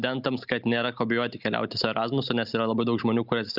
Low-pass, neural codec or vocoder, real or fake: 5.4 kHz; none; real